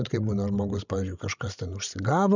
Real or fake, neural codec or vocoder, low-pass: fake; codec, 16 kHz, 16 kbps, FreqCodec, larger model; 7.2 kHz